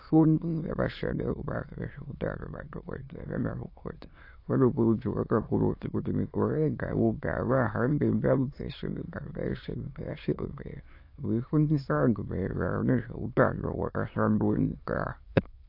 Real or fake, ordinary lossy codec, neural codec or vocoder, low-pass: fake; AAC, 32 kbps; autoencoder, 22.05 kHz, a latent of 192 numbers a frame, VITS, trained on many speakers; 5.4 kHz